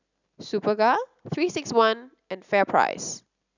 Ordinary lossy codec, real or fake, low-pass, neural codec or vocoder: none; real; 7.2 kHz; none